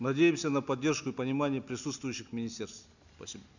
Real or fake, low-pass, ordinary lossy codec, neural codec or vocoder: real; 7.2 kHz; none; none